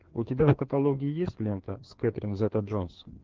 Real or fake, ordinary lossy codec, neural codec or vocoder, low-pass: fake; Opus, 16 kbps; codec, 16 kHz, 2 kbps, FreqCodec, larger model; 7.2 kHz